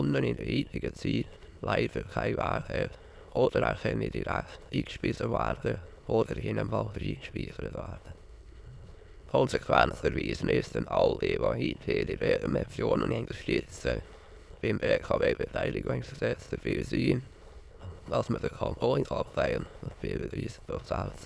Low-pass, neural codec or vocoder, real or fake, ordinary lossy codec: none; autoencoder, 22.05 kHz, a latent of 192 numbers a frame, VITS, trained on many speakers; fake; none